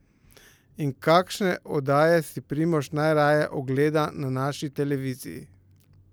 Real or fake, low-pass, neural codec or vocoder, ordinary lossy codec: real; none; none; none